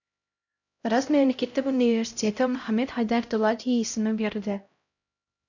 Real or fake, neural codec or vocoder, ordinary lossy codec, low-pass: fake; codec, 16 kHz, 0.5 kbps, X-Codec, HuBERT features, trained on LibriSpeech; none; 7.2 kHz